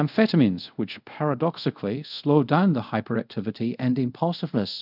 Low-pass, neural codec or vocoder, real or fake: 5.4 kHz; codec, 24 kHz, 0.5 kbps, DualCodec; fake